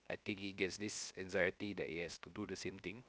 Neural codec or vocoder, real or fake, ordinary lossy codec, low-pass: codec, 16 kHz, 0.7 kbps, FocalCodec; fake; none; none